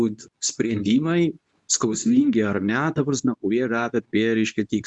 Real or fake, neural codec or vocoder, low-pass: fake; codec, 24 kHz, 0.9 kbps, WavTokenizer, medium speech release version 2; 10.8 kHz